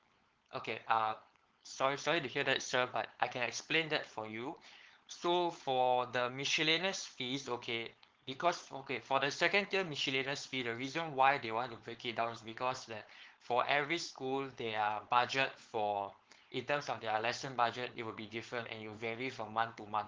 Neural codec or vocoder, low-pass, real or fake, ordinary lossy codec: codec, 16 kHz, 4.8 kbps, FACodec; 7.2 kHz; fake; Opus, 16 kbps